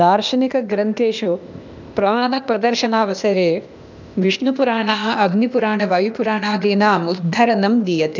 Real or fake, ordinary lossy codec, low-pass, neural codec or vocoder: fake; none; 7.2 kHz; codec, 16 kHz, 0.8 kbps, ZipCodec